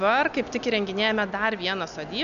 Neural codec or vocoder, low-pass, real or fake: none; 7.2 kHz; real